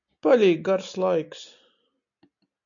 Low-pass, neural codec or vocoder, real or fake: 7.2 kHz; none; real